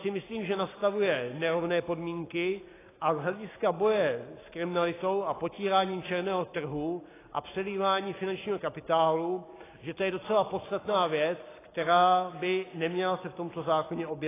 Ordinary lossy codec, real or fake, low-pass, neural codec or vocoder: AAC, 16 kbps; real; 3.6 kHz; none